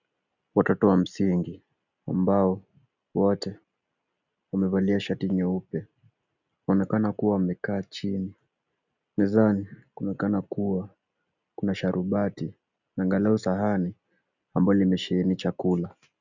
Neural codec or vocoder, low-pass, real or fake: none; 7.2 kHz; real